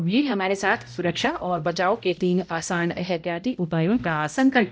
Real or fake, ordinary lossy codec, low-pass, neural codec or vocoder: fake; none; none; codec, 16 kHz, 0.5 kbps, X-Codec, HuBERT features, trained on balanced general audio